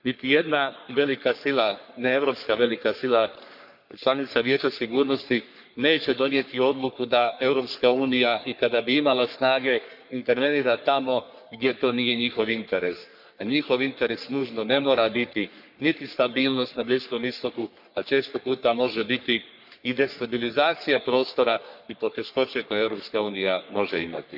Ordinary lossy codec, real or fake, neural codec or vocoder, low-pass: none; fake; codec, 44.1 kHz, 3.4 kbps, Pupu-Codec; 5.4 kHz